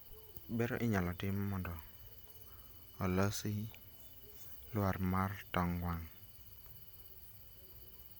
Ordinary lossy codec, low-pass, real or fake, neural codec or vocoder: none; none; real; none